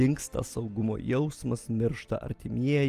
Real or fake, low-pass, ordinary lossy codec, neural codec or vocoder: real; 14.4 kHz; Opus, 32 kbps; none